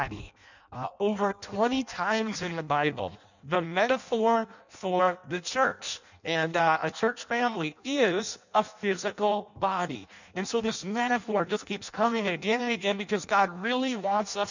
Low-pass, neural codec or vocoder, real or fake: 7.2 kHz; codec, 16 kHz in and 24 kHz out, 0.6 kbps, FireRedTTS-2 codec; fake